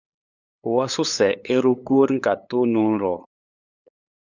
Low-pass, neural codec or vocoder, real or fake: 7.2 kHz; codec, 16 kHz, 8 kbps, FunCodec, trained on LibriTTS, 25 frames a second; fake